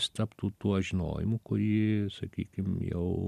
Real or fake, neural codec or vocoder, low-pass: real; none; 14.4 kHz